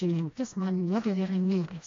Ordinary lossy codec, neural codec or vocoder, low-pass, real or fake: MP3, 48 kbps; codec, 16 kHz, 1 kbps, FreqCodec, smaller model; 7.2 kHz; fake